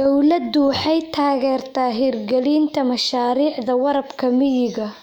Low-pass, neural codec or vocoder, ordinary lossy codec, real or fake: 19.8 kHz; autoencoder, 48 kHz, 128 numbers a frame, DAC-VAE, trained on Japanese speech; none; fake